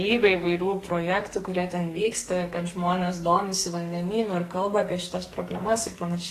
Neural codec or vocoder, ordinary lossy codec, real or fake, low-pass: codec, 44.1 kHz, 2.6 kbps, SNAC; AAC, 48 kbps; fake; 14.4 kHz